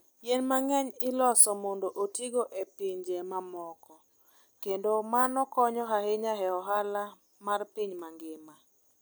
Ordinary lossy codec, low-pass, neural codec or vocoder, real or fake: none; none; none; real